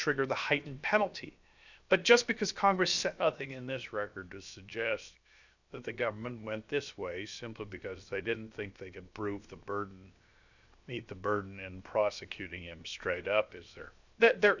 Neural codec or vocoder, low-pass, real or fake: codec, 16 kHz, 0.7 kbps, FocalCodec; 7.2 kHz; fake